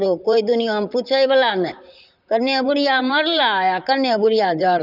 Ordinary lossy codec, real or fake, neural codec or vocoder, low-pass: none; fake; vocoder, 44.1 kHz, 128 mel bands, Pupu-Vocoder; 5.4 kHz